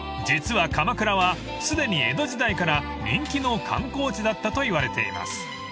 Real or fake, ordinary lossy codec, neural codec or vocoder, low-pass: real; none; none; none